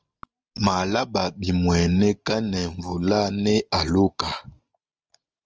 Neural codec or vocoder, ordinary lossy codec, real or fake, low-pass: none; Opus, 24 kbps; real; 7.2 kHz